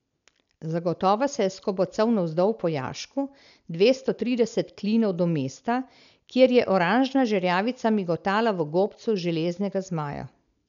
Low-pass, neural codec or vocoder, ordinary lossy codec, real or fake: 7.2 kHz; none; none; real